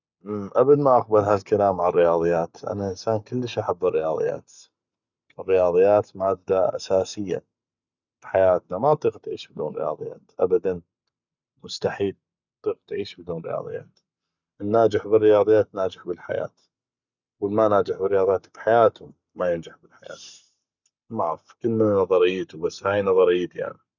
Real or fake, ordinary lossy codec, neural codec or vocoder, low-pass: fake; none; codec, 44.1 kHz, 7.8 kbps, Pupu-Codec; 7.2 kHz